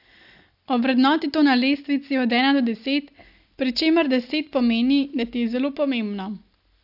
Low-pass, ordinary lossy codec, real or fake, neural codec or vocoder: 5.4 kHz; AAC, 48 kbps; real; none